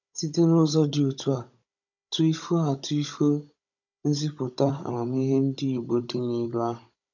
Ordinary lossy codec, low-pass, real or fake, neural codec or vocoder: none; 7.2 kHz; fake; codec, 16 kHz, 16 kbps, FunCodec, trained on Chinese and English, 50 frames a second